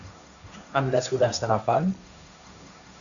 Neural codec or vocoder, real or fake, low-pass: codec, 16 kHz, 1.1 kbps, Voila-Tokenizer; fake; 7.2 kHz